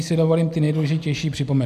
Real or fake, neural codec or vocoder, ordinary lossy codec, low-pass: real; none; MP3, 96 kbps; 14.4 kHz